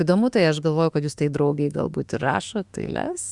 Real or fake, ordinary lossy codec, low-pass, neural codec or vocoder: fake; Opus, 64 kbps; 10.8 kHz; autoencoder, 48 kHz, 32 numbers a frame, DAC-VAE, trained on Japanese speech